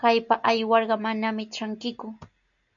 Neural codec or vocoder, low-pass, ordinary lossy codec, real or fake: none; 7.2 kHz; MP3, 96 kbps; real